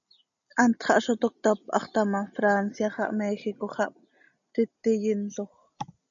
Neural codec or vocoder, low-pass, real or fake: none; 7.2 kHz; real